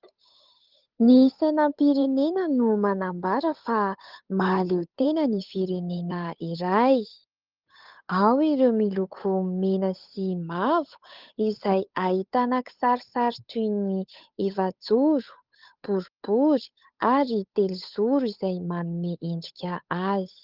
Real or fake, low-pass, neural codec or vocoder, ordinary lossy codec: fake; 5.4 kHz; codec, 16 kHz, 8 kbps, FunCodec, trained on Chinese and English, 25 frames a second; Opus, 24 kbps